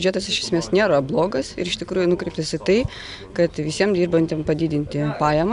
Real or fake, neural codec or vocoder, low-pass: real; none; 10.8 kHz